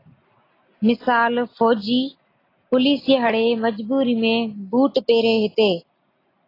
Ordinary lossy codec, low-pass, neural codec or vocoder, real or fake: AAC, 24 kbps; 5.4 kHz; none; real